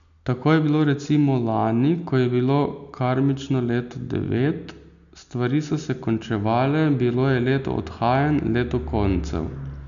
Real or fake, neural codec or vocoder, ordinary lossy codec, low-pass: real; none; none; 7.2 kHz